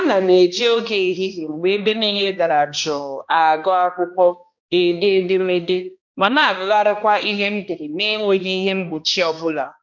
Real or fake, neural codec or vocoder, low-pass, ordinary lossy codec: fake; codec, 16 kHz, 1 kbps, X-Codec, HuBERT features, trained on balanced general audio; 7.2 kHz; none